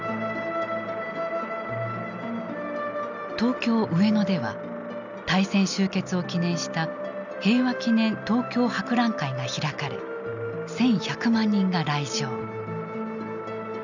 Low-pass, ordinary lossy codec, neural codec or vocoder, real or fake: 7.2 kHz; none; none; real